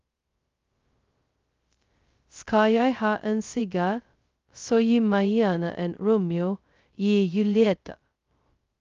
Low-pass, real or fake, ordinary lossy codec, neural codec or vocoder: 7.2 kHz; fake; Opus, 32 kbps; codec, 16 kHz, 0.2 kbps, FocalCodec